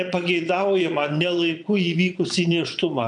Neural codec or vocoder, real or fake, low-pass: vocoder, 22.05 kHz, 80 mel bands, Vocos; fake; 9.9 kHz